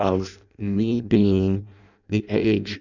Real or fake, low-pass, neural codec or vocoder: fake; 7.2 kHz; codec, 16 kHz in and 24 kHz out, 0.6 kbps, FireRedTTS-2 codec